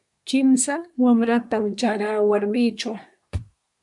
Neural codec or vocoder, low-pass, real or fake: codec, 24 kHz, 1 kbps, SNAC; 10.8 kHz; fake